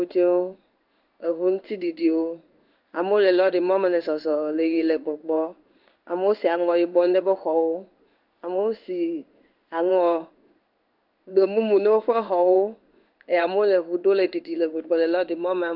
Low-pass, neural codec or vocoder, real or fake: 5.4 kHz; codec, 16 kHz in and 24 kHz out, 1 kbps, XY-Tokenizer; fake